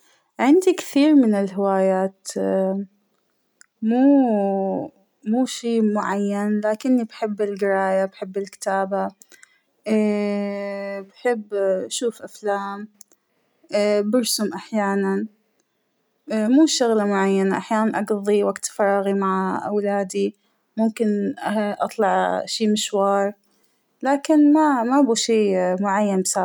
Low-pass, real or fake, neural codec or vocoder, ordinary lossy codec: none; real; none; none